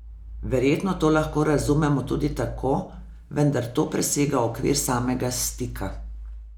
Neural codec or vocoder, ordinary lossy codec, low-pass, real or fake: none; none; none; real